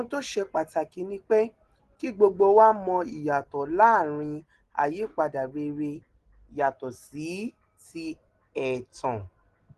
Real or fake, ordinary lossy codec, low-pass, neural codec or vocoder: real; Opus, 16 kbps; 10.8 kHz; none